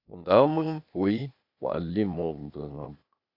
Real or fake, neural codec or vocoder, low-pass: fake; codec, 16 kHz, 0.8 kbps, ZipCodec; 5.4 kHz